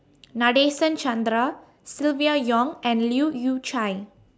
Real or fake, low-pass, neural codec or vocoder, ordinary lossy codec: real; none; none; none